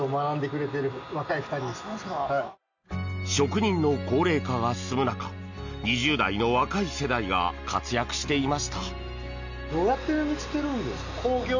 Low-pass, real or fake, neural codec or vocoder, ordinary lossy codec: 7.2 kHz; real; none; none